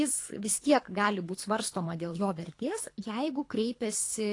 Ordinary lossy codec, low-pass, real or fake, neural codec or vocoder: AAC, 48 kbps; 10.8 kHz; fake; codec, 24 kHz, 3 kbps, HILCodec